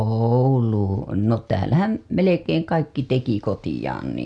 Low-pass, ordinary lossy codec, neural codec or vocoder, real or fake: none; none; none; real